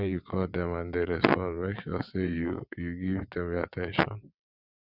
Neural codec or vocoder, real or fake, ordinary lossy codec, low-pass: vocoder, 22.05 kHz, 80 mel bands, WaveNeXt; fake; none; 5.4 kHz